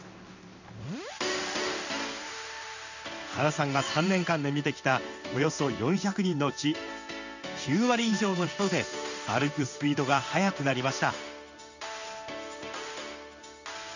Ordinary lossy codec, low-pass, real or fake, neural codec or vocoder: none; 7.2 kHz; fake; codec, 16 kHz in and 24 kHz out, 1 kbps, XY-Tokenizer